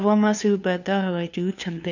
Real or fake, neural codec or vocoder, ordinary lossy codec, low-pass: fake; codec, 16 kHz, 2 kbps, FunCodec, trained on LibriTTS, 25 frames a second; none; 7.2 kHz